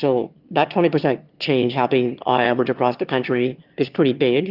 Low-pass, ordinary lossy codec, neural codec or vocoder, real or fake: 5.4 kHz; Opus, 32 kbps; autoencoder, 22.05 kHz, a latent of 192 numbers a frame, VITS, trained on one speaker; fake